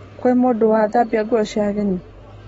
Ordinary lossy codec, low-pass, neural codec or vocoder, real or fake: AAC, 24 kbps; 19.8 kHz; none; real